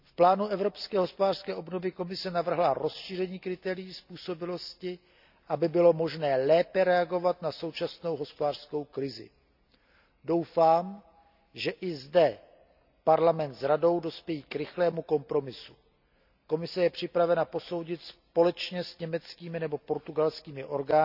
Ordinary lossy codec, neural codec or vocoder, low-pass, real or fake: none; none; 5.4 kHz; real